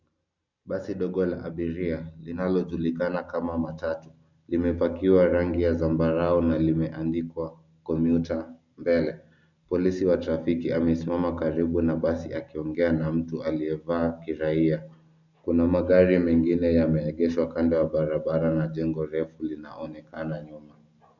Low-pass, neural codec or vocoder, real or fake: 7.2 kHz; none; real